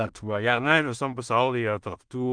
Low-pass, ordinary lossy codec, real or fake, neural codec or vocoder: 9.9 kHz; Opus, 32 kbps; fake; codec, 16 kHz in and 24 kHz out, 0.4 kbps, LongCat-Audio-Codec, two codebook decoder